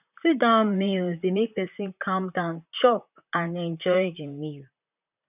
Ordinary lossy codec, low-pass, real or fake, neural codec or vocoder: none; 3.6 kHz; fake; vocoder, 44.1 kHz, 128 mel bands, Pupu-Vocoder